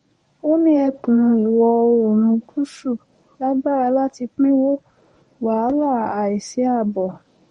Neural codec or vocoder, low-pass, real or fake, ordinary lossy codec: codec, 24 kHz, 0.9 kbps, WavTokenizer, medium speech release version 1; 10.8 kHz; fake; MP3, 48 kbps